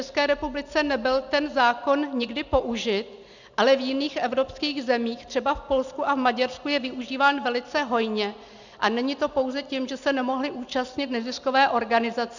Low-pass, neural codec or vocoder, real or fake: 7.2 kHz; none; real